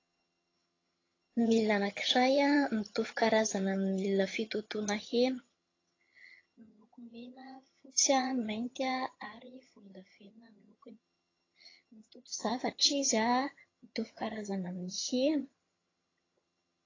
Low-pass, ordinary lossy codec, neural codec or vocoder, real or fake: 7.2 kHz; AAC, 32 kbps; vocoder, 22.05 kHz, 80 mel bands, HiFi-GAN; fake